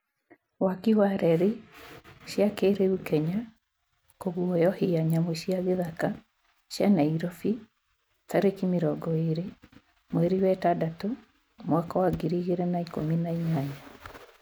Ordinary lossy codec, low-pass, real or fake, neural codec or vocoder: none; none; real; none